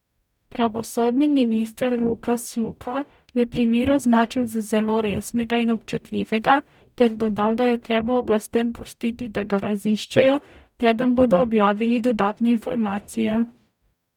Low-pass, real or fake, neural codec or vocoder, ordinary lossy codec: 19.8 kHz; fake; codec, 44.1 kHz, 0.9 kbps, DAC; none